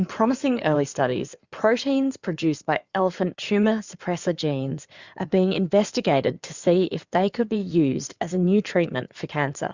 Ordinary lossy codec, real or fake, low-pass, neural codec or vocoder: Opus, 64 kbps; fake; 7.2 kHz; codec, 16 kHz in and 24 kHz out, 2.2 kbps, FireRedTTS-2 codec